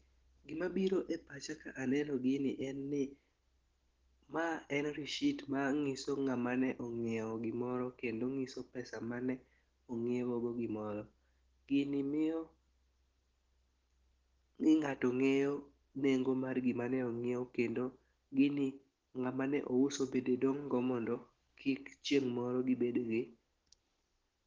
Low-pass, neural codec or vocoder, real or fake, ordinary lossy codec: 7.2 kHz; none; real; Opus, 16 kbps